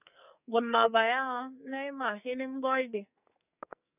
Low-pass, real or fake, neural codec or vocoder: 3.6 kHz; fake; codec, 44.1 kHz, 2.6 kbps, SNAC